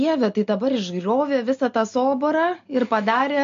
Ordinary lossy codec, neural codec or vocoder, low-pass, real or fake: MP3, 48 kbps; none; 7.2 kHz; real